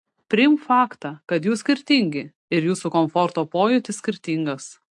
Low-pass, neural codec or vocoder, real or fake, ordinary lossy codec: 10.8 kHz; none; real; AAC, 64 kbps